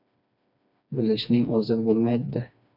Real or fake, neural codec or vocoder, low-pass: fake; codec, 16 kHz, 2 kbps, FreqCodec, smaller model; 5.4 kHz